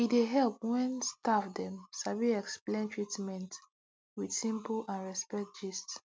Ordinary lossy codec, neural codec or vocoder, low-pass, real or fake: none; none; none; real